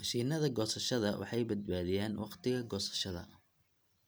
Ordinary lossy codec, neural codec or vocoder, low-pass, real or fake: none; none; none; real